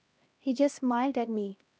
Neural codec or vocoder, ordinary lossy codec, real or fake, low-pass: codec, 16 kHz, 1 kbps, X-Codec, HuBERT features, trained on LibriSpeech; none; fake; none